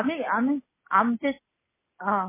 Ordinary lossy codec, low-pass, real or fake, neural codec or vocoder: MP3, 16 kbps; 3.6 kHz; real; none